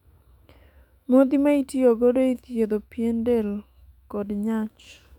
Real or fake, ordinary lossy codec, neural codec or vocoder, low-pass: fake; none; autoencoder, 48 kHz, 128 numbers a frame, DAC-VAE, trained on Japanese speech; 19.8 kHz